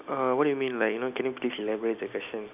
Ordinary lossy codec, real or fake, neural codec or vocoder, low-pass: none; real; none; 3.6 kHz